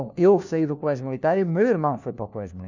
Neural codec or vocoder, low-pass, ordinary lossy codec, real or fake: codec, 16 kHz, 1 kbps, FunCodec, trained on LibriTTS, 50 frames a second; 7.2 kHz; none; fake